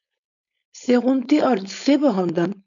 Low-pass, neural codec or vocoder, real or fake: 7.2 kHz; codec, 16 kHz, 4.8 kbps, FACodec; fake